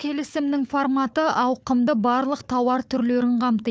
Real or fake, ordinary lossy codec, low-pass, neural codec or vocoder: fake; none; none; codec, 16 kHz, 4 kbps, FunCodec, trained on Chinese and English, 50 frames a second